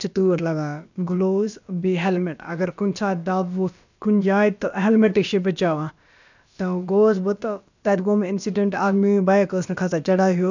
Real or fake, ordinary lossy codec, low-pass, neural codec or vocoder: fake; none; 7.2 kHz; codec, 16 kHz, about 1 kbps, DyCAST, with the encoder's durations